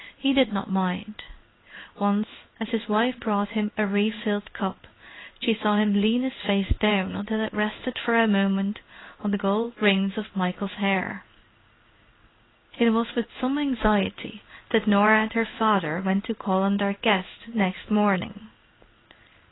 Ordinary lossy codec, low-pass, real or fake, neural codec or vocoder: AAC, 16 kbps; 7.2 kHz; fake; codec, 16 kHz in and 24 kHz out, 1 kbps, XY-Tokenizer